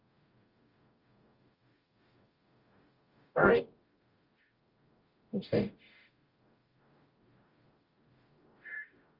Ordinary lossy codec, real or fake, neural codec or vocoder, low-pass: Opus, 24 kbps; fake; codec, 44.1 kHz, 0.9 kbps, DAC; 5.4 kHz